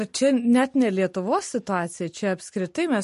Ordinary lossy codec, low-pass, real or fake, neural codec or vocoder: MP3, 48 kbps; 14.4 kHz; real; none